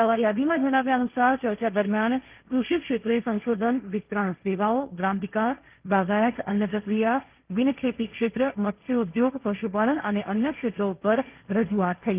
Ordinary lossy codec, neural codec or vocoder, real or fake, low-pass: Opus, 32 kbps; codec, 16 kHz, 1.1 kbps, Voila-Tokenizer; fake; 3.6 kHz